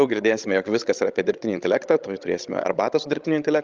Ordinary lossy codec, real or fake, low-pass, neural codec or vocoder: Opus, 32 kbps; fake; 7.2 kHz; codec, 16 kHz, 16 kbps, FreqCodec, larger model